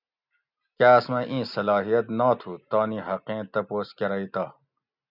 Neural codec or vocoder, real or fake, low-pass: none; real; 5.4 kHz